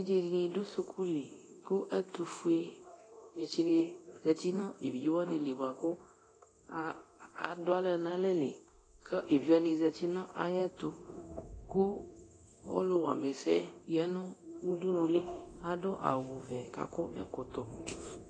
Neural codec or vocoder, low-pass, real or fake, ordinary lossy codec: codec, 24 kHz, 0.9 kbps, DualCodec; 9.9 kHz; fake; AAC, 32 kbps